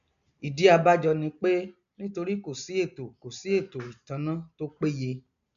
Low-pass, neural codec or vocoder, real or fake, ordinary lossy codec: 7.2 kHz; none; real; none